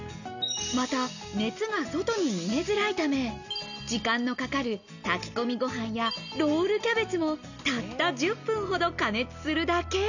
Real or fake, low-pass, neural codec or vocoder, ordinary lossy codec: real; 7.2 kHz; none; none